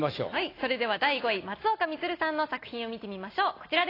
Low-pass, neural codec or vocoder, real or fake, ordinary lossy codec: 5.4 kHz; none; real; AAC, 24 kbps